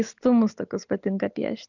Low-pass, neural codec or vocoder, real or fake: 7.2 kHz; none; real